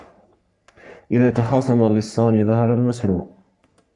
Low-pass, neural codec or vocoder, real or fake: 10.8 kHz; codec, 44.1 kHz, 3.4 kbps, Pupu-Codec; fake